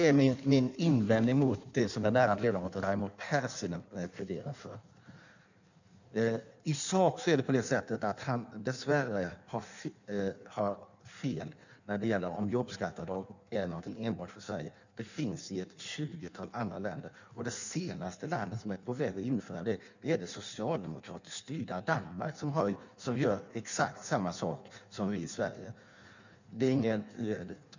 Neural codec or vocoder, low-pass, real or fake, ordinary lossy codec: codec, 16 kHz in and 24 kHz out, 1.1 kbps, FireRedTTS-2 codec; 7.2 kHz; fake; none